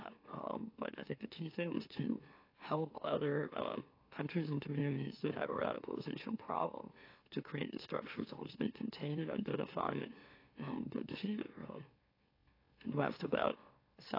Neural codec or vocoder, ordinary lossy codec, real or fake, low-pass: autoencoder, 44.1 kHz, a latent of 192 numbers a frame, MeloTTS; AAC, 32 kbps; fake; 5.4 kHz